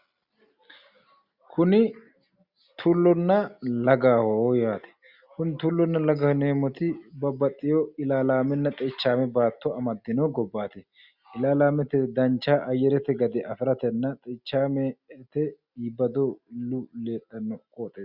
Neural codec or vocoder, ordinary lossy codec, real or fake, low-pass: none; Opus, 64 kbps; real; 5.4 kHz